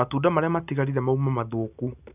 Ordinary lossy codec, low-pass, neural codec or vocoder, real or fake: none; 3.6 kHz; none; real